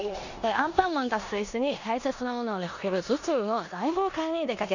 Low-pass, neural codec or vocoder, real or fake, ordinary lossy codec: 7.2 kHz; codec, 16 kHz in and 24 kHz out, 0.9 kbps, LongCat-Audio-Codec, four codebook decoder; fake; none